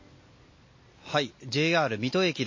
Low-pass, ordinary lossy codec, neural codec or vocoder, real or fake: 7.2 kHz; MP3, 32 kbps; none; real